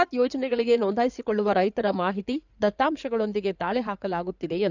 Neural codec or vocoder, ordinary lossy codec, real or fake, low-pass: codec, 16 kHz in and 24 kHz out, 2.2 kbps, FireRedTTS-2 codec; none; fake; 7.2 kHz